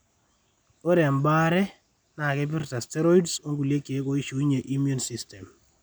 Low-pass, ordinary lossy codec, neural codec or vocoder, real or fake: none; none; none; real